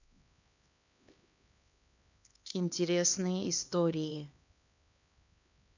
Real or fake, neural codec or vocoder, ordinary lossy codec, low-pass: fake; codec, 16 kHz, 2 kbps, X-Codec, HuBERT features, trained on LibriSpeech; none; 7.2 kHz